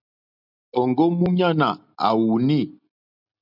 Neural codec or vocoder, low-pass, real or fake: none; 5.4 kHz; real